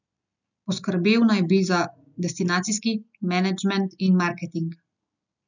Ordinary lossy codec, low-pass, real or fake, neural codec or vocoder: none; 7.2 kHz; real; none